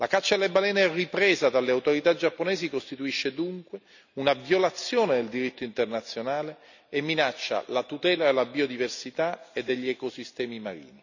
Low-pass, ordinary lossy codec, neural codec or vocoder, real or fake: 7.2 kHz; none; none; real